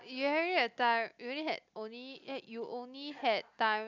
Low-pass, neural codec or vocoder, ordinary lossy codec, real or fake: 7.2 kHz; none; none; real